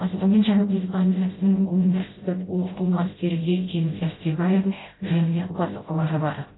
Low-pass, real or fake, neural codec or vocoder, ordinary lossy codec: 7.2 kHz; fake; codec, 16 kHz, 0.5 kbps, FreqCodec, smaller model; AAC, 16 kbps